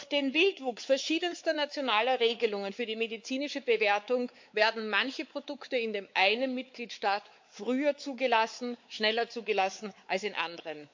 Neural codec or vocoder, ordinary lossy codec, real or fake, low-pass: codec, 16 kHz, 4 kbps, X-Codec, WavLM features, trained on Multilingual LibriSpeech; MP3, 48 kbps; fake; 7.2 kHz